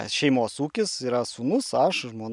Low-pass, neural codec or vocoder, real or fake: 10.8 kHz; none; real